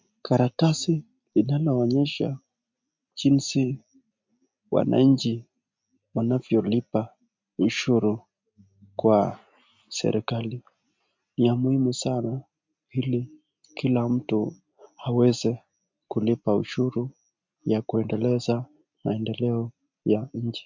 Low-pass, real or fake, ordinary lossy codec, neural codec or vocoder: 7.2 kHz; real; MP3, 64 kbps; none